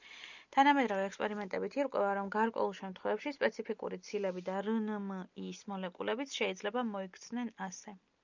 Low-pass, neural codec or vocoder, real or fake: 7.2 kHz; none; real